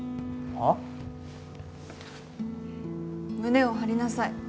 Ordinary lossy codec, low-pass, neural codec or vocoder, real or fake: none; none; none; real